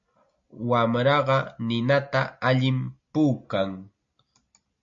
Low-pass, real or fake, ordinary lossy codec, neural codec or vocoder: 7.2 kHz; real; MP3, 48 kbps; none